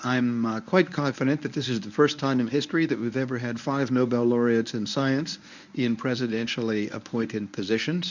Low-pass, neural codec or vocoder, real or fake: 7.2 kHz; codec, 24 kHz, 0.9 kbps, WavTokenizer, medium speech release version 1; fake